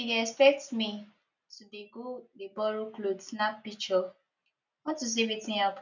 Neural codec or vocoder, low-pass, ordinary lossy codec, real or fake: none; 7.2 kHz; none; real